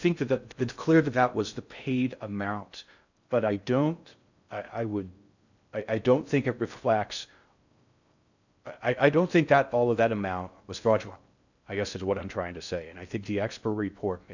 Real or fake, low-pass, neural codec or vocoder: fake; 7.2 kHz; codec, 16 kHz in and 24 kHz out, 0.6 kbps, FocalCodec, streaming, 2048 codes